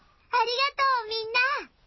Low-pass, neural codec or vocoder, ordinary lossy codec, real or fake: 7.2 kHz; none; MP3, 24 kbps; real